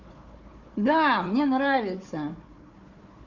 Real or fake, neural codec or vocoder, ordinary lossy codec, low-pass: fake; codec, 16 kHz, 4 kbps, FunCodec, trained on Chinese and English, 50 frames a second; none; 7.2 kHz